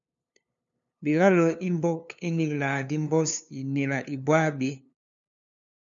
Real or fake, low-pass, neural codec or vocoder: fake; 7.2 kHz; codec, 16 kHz, 2 kbps, FunCodec, trained on LibriTTS, 25 frames a second